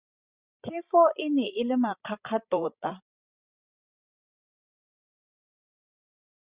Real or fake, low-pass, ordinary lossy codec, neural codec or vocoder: fake; 3.6 kHz; Opus, 64 kbps; codec, 16 kHz, 16 kbps, FreqCodec, larger model